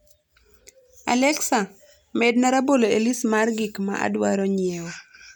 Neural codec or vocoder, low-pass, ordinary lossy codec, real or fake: none; none; none; real